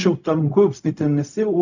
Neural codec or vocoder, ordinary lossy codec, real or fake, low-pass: codec, 16 kHz, 0.4 kbps, LongCat-Audio-Codec; none; fake; 7.2 kHz